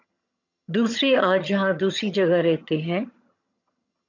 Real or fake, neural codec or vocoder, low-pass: fake; vocoder, 22.05 kHz, 80 mel bands, HiFi-GAN; 7.2 kHz